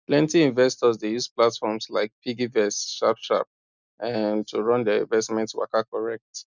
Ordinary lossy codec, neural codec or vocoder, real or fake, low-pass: none; none; real; 7.2 kHz